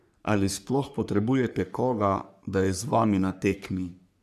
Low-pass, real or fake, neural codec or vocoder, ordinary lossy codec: 14.4 kHz; fake; codec, 44.1 kHz, 3.4 kbps, Pupu-Codec; none